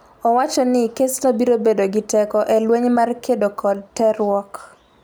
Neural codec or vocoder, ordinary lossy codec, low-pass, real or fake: none; none; none; real